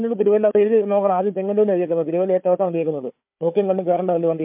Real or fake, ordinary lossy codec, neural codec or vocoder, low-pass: fake; MP3, 32 kbps; codec, 16 kHz, 4 kbps, FunCodec, trained on Chinese and English, 50 frames a second; 3.6 kHz